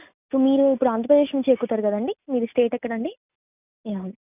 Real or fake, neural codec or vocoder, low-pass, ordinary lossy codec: real; none; 3.6 kHz; none